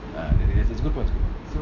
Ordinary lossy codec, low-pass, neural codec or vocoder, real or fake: none; 7.2 kHz; none; real